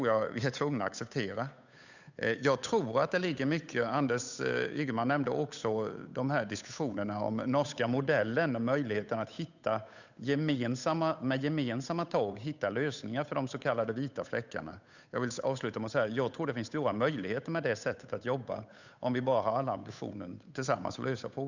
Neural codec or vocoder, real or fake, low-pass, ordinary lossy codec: codec, 16 kHz, 8 kbps, FunCodec, trained on Chinese and English, 25 frames a second; fake; 7.2 kHz; none